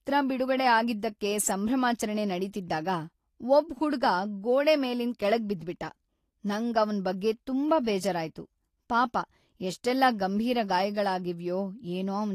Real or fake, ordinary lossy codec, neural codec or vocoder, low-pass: real; AAC, 48 kbps; none; 14.4 kHz